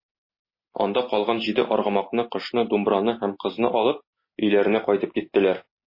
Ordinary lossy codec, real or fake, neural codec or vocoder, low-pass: MP3, 24 kbps; real; none; 5.4 kHz